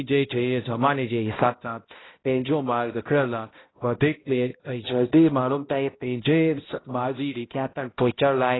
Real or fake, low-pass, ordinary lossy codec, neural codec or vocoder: fake; 7.2 kHz; AAC, 16 kbps; codec, 16 kHz, 0.5 kbps, X-Codec, HuBERT features, trained on balanced general audio